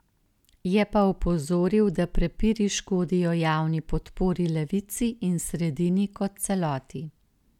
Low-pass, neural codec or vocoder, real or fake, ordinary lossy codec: 19.8 kHz; none; real; none